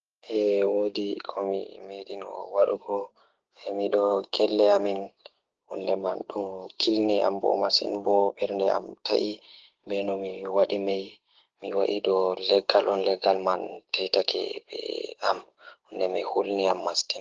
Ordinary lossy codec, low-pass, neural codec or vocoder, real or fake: Opus, 16 kbps; 7.2 kHz; codec, 16 kHz, 6 kbps, DAC; fake